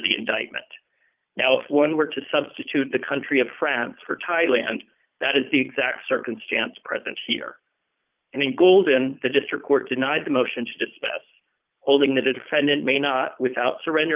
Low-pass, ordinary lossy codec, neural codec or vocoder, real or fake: 3.6 kHz; Opus, 24 kbps; vocoder, 22.05 kHz, 80 mel bands, Vocos; fake